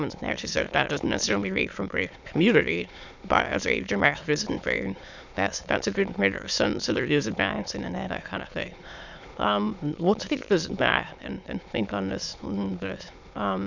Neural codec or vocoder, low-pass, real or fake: autoencoder, 22.05 kHz, a latent of 192 numbers a frame, VITS, trained on many speakers; 7.2 kHz; fake